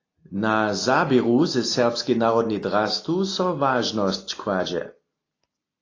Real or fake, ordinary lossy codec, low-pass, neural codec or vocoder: real; AAC, 32 kbps; 7.2 kHz; none